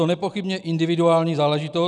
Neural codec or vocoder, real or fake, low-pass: none; real; 10.8 kHz